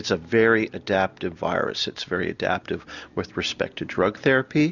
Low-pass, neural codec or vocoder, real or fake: 7.2 kHz; none; real